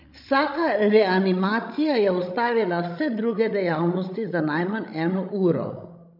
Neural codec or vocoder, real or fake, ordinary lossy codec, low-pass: codec, 16 kHz, 16 kbps, FreqCodec, larger model; fake; none; 5.4 kHz